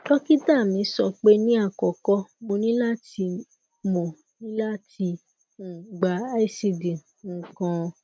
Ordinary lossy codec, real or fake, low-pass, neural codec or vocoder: none; real; none; none